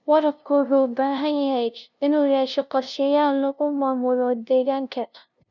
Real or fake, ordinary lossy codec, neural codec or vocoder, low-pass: fake; none; codec, 16 kHz, 0.5 kbps, FunCodec, trained on LibriTTS, 25 frames a second; 7.2 kHz